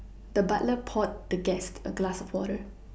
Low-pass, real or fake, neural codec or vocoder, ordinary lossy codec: none; real; none; none